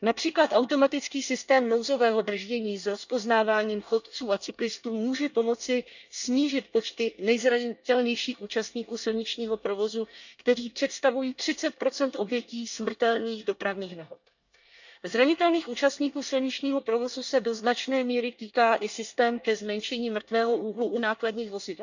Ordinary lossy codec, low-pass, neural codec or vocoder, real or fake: none; 7.2 kHz; codec, 24 kHz, 1 kbps, SNAC; fake